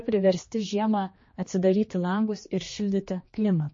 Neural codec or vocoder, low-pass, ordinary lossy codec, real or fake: codec, 16 kHz, 2 kbps, X-Codec, HuBERT features, trained on general audio; 7.2 kHz; MP3, 32 kbps; fake